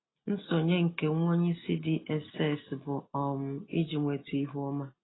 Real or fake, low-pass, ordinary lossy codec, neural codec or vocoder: real; 7.2 kHz; AAC, 16 kbps; none